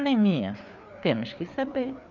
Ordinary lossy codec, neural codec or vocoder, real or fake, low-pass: none; codec, 16 kHz, 4 kbps, FreqCodec, larger model; fake; 7.2 kHz